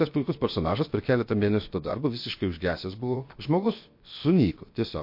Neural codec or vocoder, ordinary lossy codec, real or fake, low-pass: codec, 16 kHz, 0.7 kbps, FocalCodec; MP3, 32 kbps; fake; 5.4 kHz